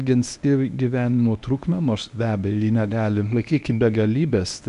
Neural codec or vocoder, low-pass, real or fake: codec, 24 kHz, 0.9 kbps, WavTokenizer, medium speech release version 1; 10.8 kHz; fake